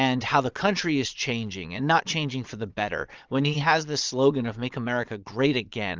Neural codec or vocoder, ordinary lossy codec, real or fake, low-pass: vocoder, 22.05 kHz, 80 mel bands, Vocos; Opus, 24 kbps; fake; 7.2 kHz